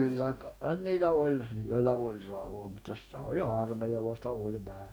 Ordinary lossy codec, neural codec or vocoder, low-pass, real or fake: none; codec, 44.1 kHz, 2.6 kbps, DAC; none; fake